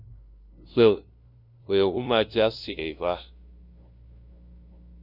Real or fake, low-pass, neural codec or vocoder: fake; 5.4 kHz; codec, 16 kHz, 0.5 kbps, FunCodec, trained on LibriTTS, 25 frames a second